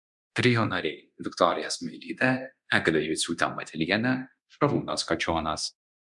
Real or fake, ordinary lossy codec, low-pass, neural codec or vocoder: fake; MP3, 96 kbps; 10.8 kHz; codec, 24 kHz, 0.9 kbps, DualCodec